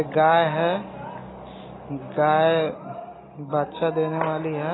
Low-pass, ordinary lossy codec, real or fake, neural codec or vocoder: 7.2 kHz; AAC, 16 kbps; real; none